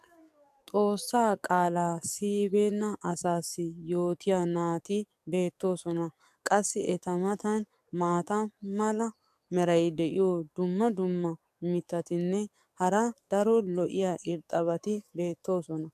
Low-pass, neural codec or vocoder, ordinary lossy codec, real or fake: 14.4 kHz; codec, 44.1 kHz, 7.8 kbps, DAC; AAC, 96 kbps; fake